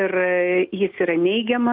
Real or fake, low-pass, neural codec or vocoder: real; 5.4 kHz; none